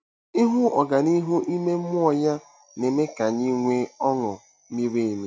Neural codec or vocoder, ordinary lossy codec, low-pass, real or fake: none; none; none; real